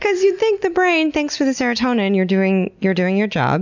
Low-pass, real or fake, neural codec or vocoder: 7.2 kHz; real; none